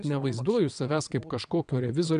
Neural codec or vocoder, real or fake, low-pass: vocoder, 22.05 kHz, 80 mel bands, WaveNeXt; fake; 9.9 kHz